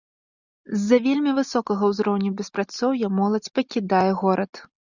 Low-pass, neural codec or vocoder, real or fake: 7.2 kHz; none; real